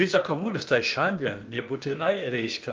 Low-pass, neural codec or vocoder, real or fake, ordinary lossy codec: 7.2 kHz; codec, 16 kHz, 0.8 kbps, ZipCodec; fake; Opus, 24 kbps